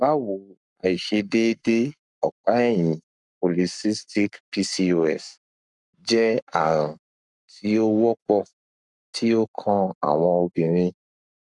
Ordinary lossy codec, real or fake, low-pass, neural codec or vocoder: none; fake; 10.8 kHz; codec, 44.1 kHz, 7.8 kbps, Pupu-Codec